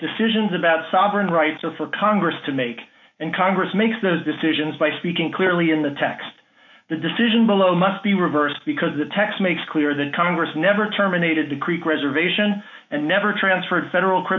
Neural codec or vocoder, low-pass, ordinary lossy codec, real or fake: autoencoder, 48 kHz, 128 numbers a frame, DAC-VAE, trained on Japanese speech; 7.2 kHz; AAC, 48 kbps; fake